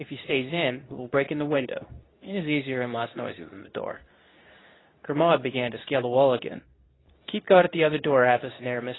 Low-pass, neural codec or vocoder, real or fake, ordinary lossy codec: 7.2 kHz; codec, 24 kHz, 0.9 kbps, WavTokenizer, medium speech release version 2; fake; AAC, 16 kbps